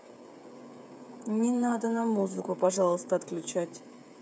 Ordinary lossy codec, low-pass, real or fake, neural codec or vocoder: none; none; fake; codec, 16 kHz, 16 kbps, FreqCodec, smaller model